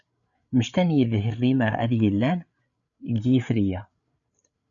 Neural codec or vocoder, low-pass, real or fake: codec, 16 kHz, 8 kbps, FreqCodec, larger model; 7.2 kHz; fake